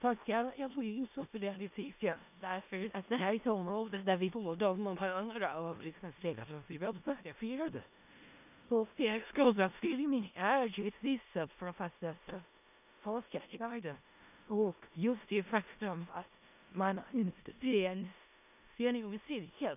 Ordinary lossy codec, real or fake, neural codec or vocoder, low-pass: none; fake; codec, 16 kHz in and 24 kHz out, 0.4 kbps, LongCat-Audio-Codec, four codebook decoder; 3.6 kHz